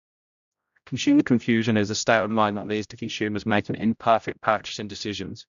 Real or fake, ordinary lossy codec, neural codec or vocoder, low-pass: fake; AAC, 96 kbps; codec, 16 kHz, 0.5 kbps, X-Codec, HuBERT features, trained on general audio; 7.2 kHz